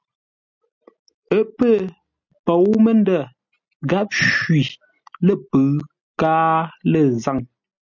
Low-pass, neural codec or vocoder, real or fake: 7.2 kHz; none; real